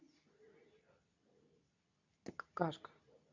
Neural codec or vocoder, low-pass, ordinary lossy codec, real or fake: codec, 24 kHz, 0.9 kbps, WavTokenizer, medium speech release version 2; 7.2 kHz; none; fake